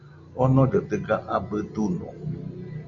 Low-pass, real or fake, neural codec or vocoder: 7.2 kHz; real; none